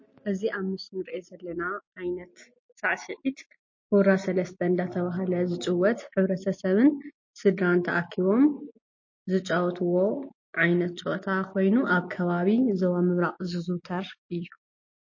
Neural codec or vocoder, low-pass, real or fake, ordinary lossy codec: none; 7.2 kHz; real; MP3, 32 kbps